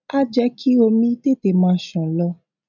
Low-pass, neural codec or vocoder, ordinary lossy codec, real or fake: 7.2 kHz; none; MP3, 64 kbps; real